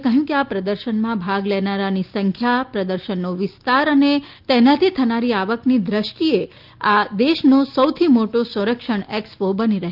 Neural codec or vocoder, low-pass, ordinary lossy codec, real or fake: none; 5.4 kHz; Opus, 32 kbps; real